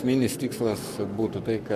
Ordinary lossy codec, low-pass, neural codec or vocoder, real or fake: MP3, 96 kbps; 14.4 kHz; codec, 44.1 kHz, 7.8 kbps, Pupu-Codec; fake